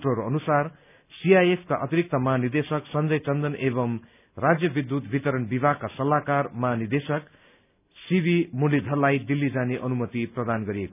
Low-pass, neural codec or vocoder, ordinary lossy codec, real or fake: 3.6 kHz; none; none; real